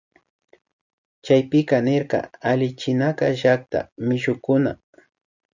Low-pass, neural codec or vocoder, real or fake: 7.2 kHz; none; real